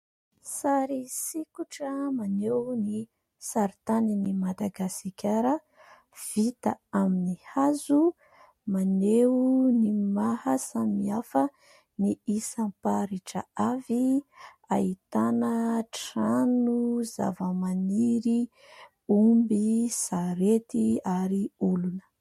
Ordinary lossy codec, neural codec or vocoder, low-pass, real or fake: MP3, 64 kbps; none; 19.8 kHz; real